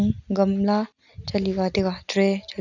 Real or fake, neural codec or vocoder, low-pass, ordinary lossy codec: real; none; 7.2 kHz; none